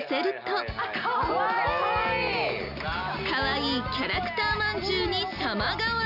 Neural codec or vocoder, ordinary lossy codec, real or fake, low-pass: none; none; real; 5.4 kHz